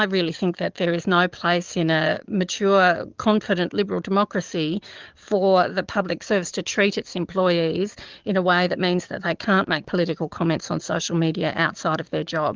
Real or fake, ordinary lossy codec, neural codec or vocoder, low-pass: fake; Opus, 32 kbps; codec, 44.1 kHz, 7.8 kbps, DAC; 7.2 kHz